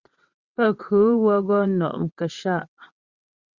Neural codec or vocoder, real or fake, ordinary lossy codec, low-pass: vocoder, 22.05 kHz, 80 mel bands, WaveNeXt; fake; Opus, 64 kbps; 7.2 kHz